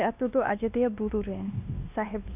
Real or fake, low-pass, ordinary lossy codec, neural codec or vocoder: fake; 3.6 kHz; none; codec, 16 kHz, 1 kbps, X-Codec, WavLM features, trained on Multilingual LibriSpeech